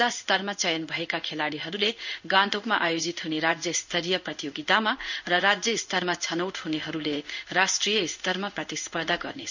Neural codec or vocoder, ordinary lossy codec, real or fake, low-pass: codec, 16 kHz in and 24 kHz out, 1 kbps, XY-Tokenizer; none; fake; 7.2 kHz